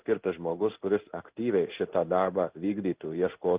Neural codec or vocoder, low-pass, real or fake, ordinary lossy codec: codec, 16 kHz in and 24 kHz out, 1 kbps, XY-Tokenizer; 3.6 kHz; fake; Opus, 32 kbps